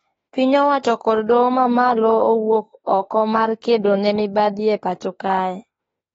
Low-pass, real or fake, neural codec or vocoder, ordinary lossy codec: 19.8 kHz; fake; autoencoder, 48 kHz, 32 numbers a frame, DAC-VAE, trained on Japanese speech; AAC, 24 kbps